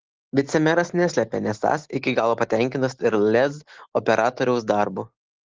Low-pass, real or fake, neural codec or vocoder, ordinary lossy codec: 7.2 kHz; real; none; Opus, 16 kbps